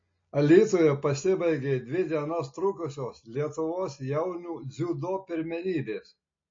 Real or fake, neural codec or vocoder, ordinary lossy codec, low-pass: real; none; MP3, 32 kbps; 7.2 kHz